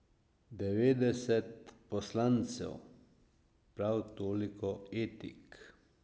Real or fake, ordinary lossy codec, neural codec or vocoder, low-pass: real; none; none; none